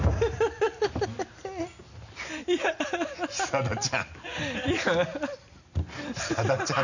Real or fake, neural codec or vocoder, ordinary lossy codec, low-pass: real; none; none; 7.2 kHz